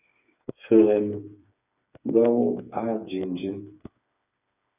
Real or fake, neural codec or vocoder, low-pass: fake; codec, 16 kHz, 4 kbps, FreqCodec, smaller model; 3.6 kHz